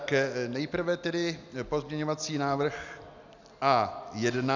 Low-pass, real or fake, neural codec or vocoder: 7.2 kHz; real; none